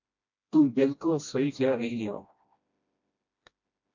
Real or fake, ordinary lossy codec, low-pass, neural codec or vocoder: fake; MP3, 64 kbps; 7.2 kHz; codec, 16 kHz, 1 kbps, FreqCodec, smaller model